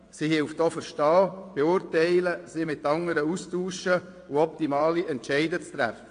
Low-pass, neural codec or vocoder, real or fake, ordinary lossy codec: 9.9 kHz; vocoder, 22.05 kHz, 80 mel bands, WaveNeXt; fake; AAC, 48 kbps